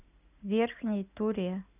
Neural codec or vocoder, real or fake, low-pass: none; real; 3.6 kHz